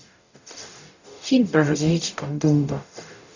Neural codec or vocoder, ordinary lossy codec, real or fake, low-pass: codec, 44.1 kHz, 0.9 kbps, DAC; none; fake; 7.2 kHz